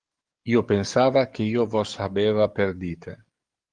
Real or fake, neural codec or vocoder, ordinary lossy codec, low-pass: fake; codec, 44.1 kHz, 7.8 kbps, DAC; Opus, 24 kbps; 9.9 kHz